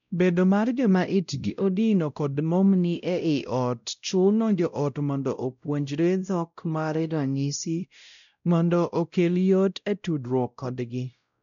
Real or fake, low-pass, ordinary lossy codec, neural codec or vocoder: fake; 7.2 kHz; none; codec, 16 kHz, 0.5 kbps, X-Codec, WavLM features, trained on Multilingual LibriSpeech